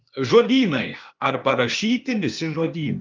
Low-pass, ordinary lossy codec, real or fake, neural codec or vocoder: 7.2 kHz; Opus, 24 kbps; fake; codec, 16 kHz, 0.8 kbps, ZipCodec